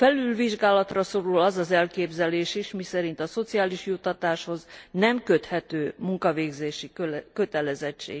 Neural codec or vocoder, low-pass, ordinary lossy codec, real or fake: none; none; none; real